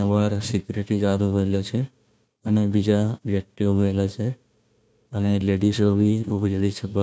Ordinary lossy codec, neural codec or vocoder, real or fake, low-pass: none; codec, 16 kHz, 1 kbps, FunCodec, trained on Chinese and English, 50 frames a second; fake; none